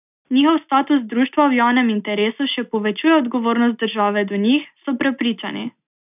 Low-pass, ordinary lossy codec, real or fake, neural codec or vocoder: 3.6 kHz; none; real; none